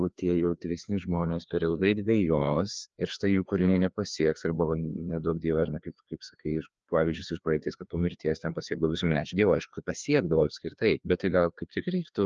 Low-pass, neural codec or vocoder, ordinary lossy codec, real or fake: 7.2 kHz; codec, 16 kHz, 2 kbps, FreqCodec, larger model; Opus, 24 kbps; fake